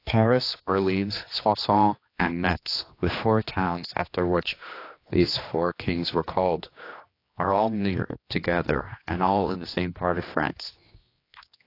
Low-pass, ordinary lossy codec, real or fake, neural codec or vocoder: 5.4 kHz; AAC, 32 kbps; fake; codec, 16 kHz, 2 kbps, X-Codec, HuBERT features, trained on general audio